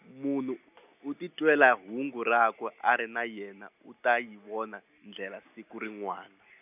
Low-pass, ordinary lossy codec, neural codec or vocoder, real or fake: 3.6 kHz; none; none; real